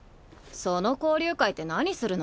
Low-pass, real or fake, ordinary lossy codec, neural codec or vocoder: none; real; none; none